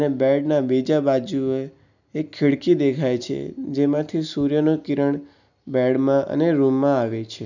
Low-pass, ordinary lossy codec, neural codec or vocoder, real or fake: 7.2 kHz; none; none; real